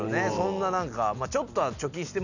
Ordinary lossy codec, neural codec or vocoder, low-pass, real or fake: none; none; 7.2 kHz; real